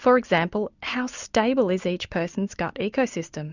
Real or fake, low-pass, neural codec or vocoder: real; 7.2 kHz; none